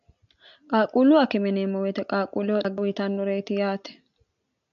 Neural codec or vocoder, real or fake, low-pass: none; real; 7.2 kHz